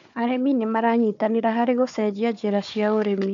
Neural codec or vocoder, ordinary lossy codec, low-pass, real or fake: codec, 16 kHz, 4 kbps, FunCodec, trained on Chinese and English, 50 frames a second; MP3, 64 kbps; 7.2 kHz; fake